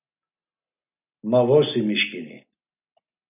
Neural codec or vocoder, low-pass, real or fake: none; 3.6 kHz; real